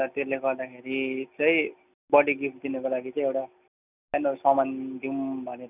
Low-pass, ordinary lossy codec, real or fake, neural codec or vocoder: 3.6 kHz; none; real; none